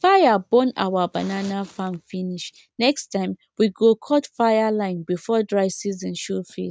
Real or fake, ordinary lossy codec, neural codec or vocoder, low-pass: real; none; none; none